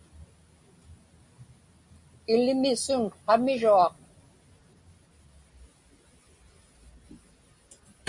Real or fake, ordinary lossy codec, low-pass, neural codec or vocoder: real; Opus, 64 kbps; 10.8 kHz; none